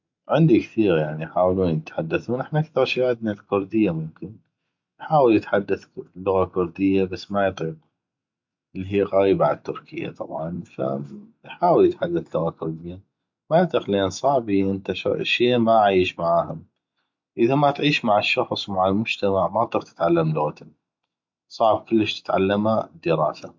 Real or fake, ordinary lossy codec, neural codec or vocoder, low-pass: real; MP3, 64 kbps; none; 7.2 kHz